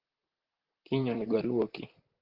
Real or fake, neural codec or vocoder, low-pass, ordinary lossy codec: fake; vocoder, 44.1 kHz, 128 mel bands, Pupu-Vocoder; 5.4 kHz; Opus, 16 kbps